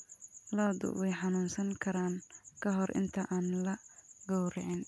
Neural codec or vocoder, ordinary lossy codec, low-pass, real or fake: none; none; none; real